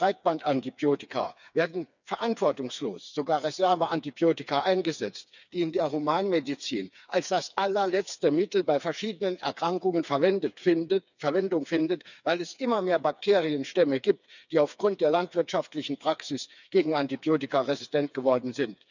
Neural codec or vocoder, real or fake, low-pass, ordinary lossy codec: codec, 16 kHz, 4 kbps, FreqCodec, smaller model; fake; 7.2 kHz; none